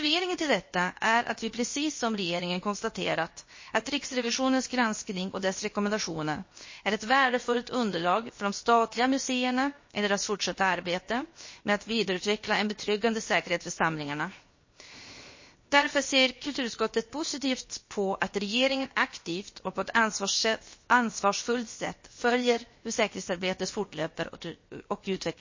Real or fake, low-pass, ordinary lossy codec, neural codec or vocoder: fake; 7.2 kHz; MP3, 32 kbps; codec, 16 kHz, 0.7 kbps, FocalCodec